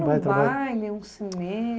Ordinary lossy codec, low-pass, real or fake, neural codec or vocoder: none; none; real; none